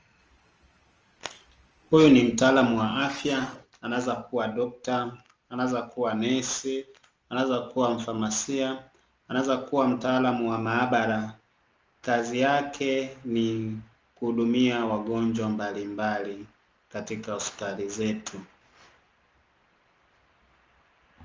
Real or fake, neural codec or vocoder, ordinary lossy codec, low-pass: real; none; Opus, 24 kbps; 7.2 kHz